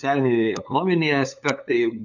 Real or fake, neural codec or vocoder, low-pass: fake; codec, 16 kHz, 8 kbps, FunCodec, trained on LibriTTS, 25 frames a second; 7.2 kHz